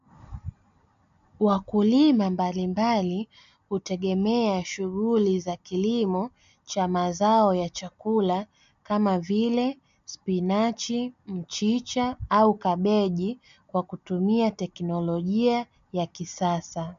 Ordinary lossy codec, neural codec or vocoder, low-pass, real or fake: MP3, 64 kbps; none; 7.2 kHz; real